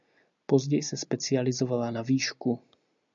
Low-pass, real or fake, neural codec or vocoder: 7.2 kHz; real; none